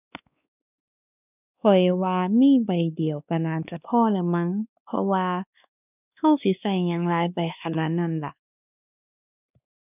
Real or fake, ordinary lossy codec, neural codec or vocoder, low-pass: fake; none; codec, 16 kHz, 2 kbps, X-Codec, WavLM features, trained on Multilingual LibriSpeech; 3.6 kHz